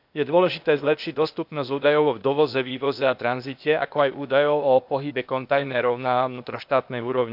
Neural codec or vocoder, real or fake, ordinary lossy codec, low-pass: codec, 16 kHz, 0.8 kbps, ZipCodec; fake; none; 5.4 kHz